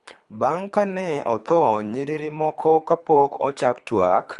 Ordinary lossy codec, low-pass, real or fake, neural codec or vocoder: Opus, 64 kbps; 10.8 kHz; fake; codec, 24 kHz, 3 kbps, HILCodec